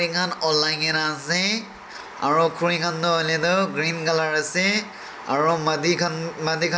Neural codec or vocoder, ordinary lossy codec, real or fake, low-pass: none; none; real; none